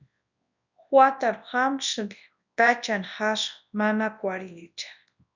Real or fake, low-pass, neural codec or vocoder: fake; 7.2 kHz; codec, 24 kHz, 0.9 kbps, WavTokenizer, large speech release